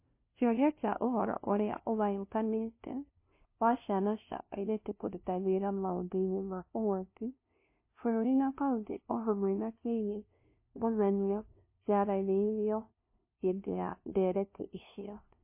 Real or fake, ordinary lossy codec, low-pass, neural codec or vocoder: fake; MP3, 24 kbps; 3.6 kHz; codec, 16 kHz, 0.5 kbps, FunCodec, trained on LibriTTS, 25 frames a second